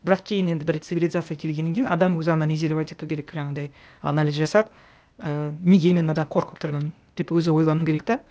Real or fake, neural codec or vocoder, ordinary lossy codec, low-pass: fake; codec, 16 kHz, 0.8 kbps, ZipCodec; none; none